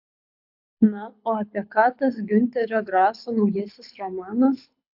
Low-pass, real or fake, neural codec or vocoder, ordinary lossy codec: 5.4 kHz; fake; codec, 24 kHz, 6 kbps, HILCodec; AAC, 48 kbps